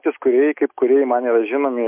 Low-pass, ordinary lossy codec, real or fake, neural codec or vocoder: 3.6 kHz; MP3, 32 kbps; real; none